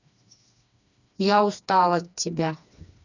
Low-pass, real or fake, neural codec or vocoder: 7.2 kHz; fake; codec, 16 kHz, 2 kbps, FreqCodec, smaller model